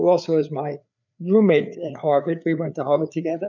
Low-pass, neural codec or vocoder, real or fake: 7.2 kHz; codec, 16 kHz, 4 kbps, FreqCodec, larger model; fake